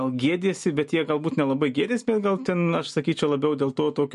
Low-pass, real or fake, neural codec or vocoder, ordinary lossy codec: 14.4 kHz; real; none; MP3, 48 kbps